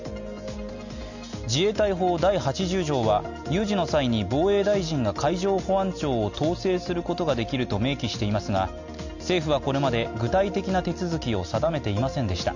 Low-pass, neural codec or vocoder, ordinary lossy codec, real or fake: 7.2 kHz; none; none; real